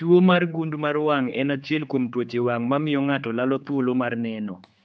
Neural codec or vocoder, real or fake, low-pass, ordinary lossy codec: codec, 16 kHz, 4 kbps, X-Codec, HuBERT features, trained on general audio; fake; none; none